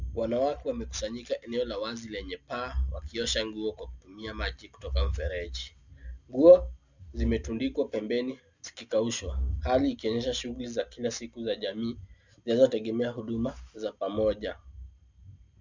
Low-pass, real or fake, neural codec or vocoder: 7.2 kHz; real; none